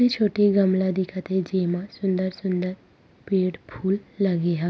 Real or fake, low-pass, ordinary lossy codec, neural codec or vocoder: real; none; none; none